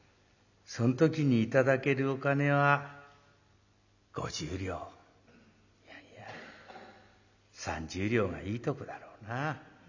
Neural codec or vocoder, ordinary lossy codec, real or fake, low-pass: none; none; real; 7.2 kHz